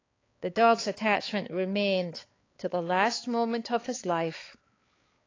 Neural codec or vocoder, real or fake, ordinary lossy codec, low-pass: codec, 16 kHz, 4 kbps, X-Codec, HuBERT features, trained on balanced general audio; fake; AAC, 32 kbps; 7.2 kHz